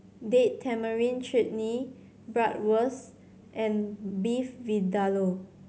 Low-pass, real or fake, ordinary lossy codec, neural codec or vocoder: none; real; none; none